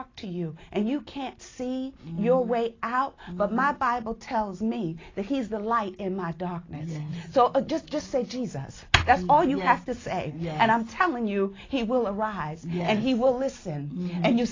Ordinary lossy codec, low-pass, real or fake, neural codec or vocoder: AAC, 32 kbps; 7.2 kHz; real; none